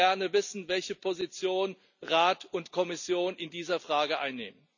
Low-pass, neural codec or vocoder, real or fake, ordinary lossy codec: 7.2 kHz; none; real; none